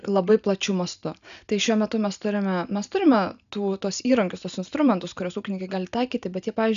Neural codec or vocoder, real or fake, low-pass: none; real; 7.2 kHz